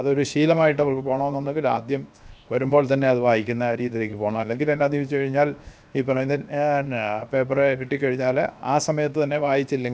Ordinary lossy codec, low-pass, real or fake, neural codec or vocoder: none; none; fake; codec, 16 kHz, 0.7 kbps, FocalCodec